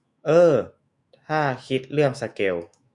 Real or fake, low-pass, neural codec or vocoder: fake; 10.8 kHz; autoencoder, 48 kHz, 128 numbers a frame, DAC-VAE, trained on Japanese speech